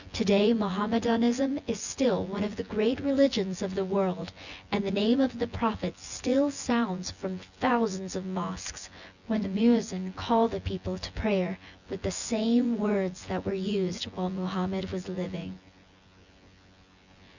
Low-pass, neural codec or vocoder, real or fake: 7.2 kHz; vocoder, 24 kHz, 100 mel bands, Vocos; fake